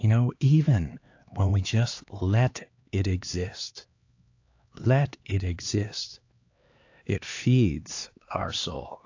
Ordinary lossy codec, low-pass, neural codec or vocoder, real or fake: AAC, 48 kbps; 7.2 kHz; codec, 16 kHz, 2 kbps, X-Codec, HuBERT features, trained on LibriSpeech; fake